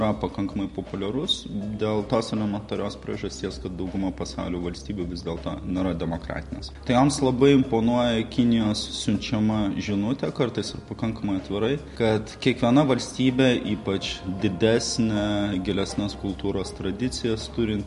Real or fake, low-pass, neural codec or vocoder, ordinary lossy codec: real; 14.4 kHz; none; MP3, 48 kbps